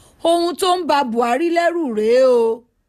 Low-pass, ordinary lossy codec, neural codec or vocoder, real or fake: 14.4 kHz; none; none; real